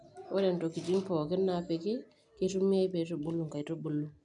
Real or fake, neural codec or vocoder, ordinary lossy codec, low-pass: real; none; none; 10.8 kHz